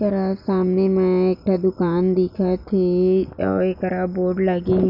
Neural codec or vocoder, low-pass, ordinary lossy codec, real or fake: none; 5.4 kHz; none; real